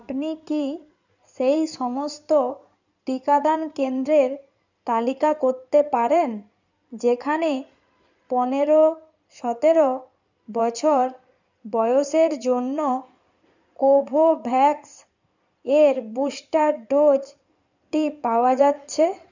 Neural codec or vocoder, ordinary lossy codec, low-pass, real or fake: codec, 16 kHz in and 24 kHz out, 2.2 kbps, FireRedTTS-2 codec; none; 7.2 kHz; fake